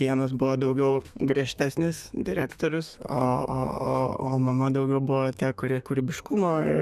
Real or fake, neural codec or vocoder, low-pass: fake; codec, 32 kHz, 1.9 kbps, SNAC; 14.4 kHz